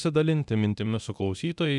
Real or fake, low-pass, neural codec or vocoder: fake; 10.8 kHz; codec, 24 kHz, 0.9 kbps, DualCodec